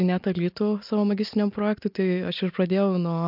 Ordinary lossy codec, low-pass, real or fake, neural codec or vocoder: MP3, 48 kbps; 5.4 kHz; real; none